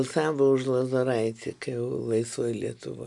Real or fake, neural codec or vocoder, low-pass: real; none; 10.8 kHz